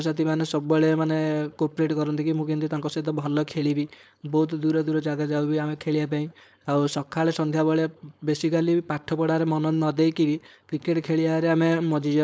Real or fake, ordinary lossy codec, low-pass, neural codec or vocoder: fake; none; none; codec, 16 kHz, 4.8 kbps, FACodec